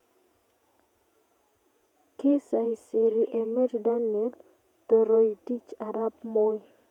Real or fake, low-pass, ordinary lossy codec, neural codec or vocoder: fake; 19.8 kHz; none; vocoder, 48 kHz, 128 mel bands, Vocos